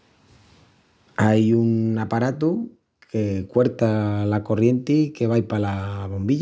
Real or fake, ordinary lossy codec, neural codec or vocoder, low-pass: real; none; none; none